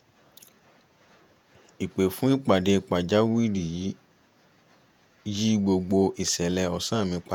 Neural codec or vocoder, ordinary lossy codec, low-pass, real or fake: vocoder, 48 kHz, 128 mel bands, Vocos; none; 19.8 kHz; fake